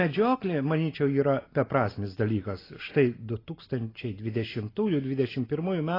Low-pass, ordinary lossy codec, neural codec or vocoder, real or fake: 5.4 kHz; AAC, 24 kbps; none; real